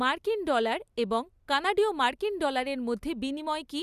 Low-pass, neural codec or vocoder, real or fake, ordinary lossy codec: 14.4 kHz; none; real; Opus, 64 kbps